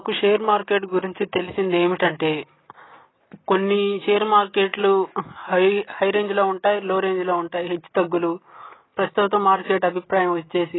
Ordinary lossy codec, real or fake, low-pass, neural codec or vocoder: AAC, 16 kbps; real; 7.2 kHz; none